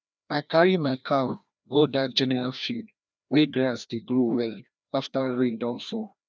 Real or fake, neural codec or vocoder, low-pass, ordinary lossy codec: fake; codec, 16 kHz, 1 kbps, FreqCodec, larger model; none; none